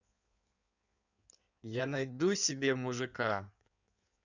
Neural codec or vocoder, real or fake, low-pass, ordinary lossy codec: codec, 16 kHz in and 24 kHz out, 1.1 kbps, FireRedTTS-2 codec; fake; 7.2 kHz; none